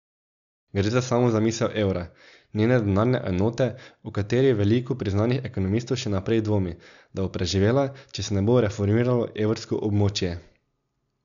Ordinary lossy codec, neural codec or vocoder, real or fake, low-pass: none; none; real; 7.2 kHz